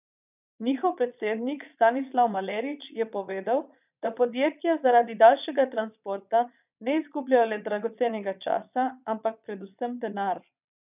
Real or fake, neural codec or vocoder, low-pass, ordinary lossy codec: fake; vocoder, 22.05 kHz, 80 mel bands, Vocos; 3.6 kHz; none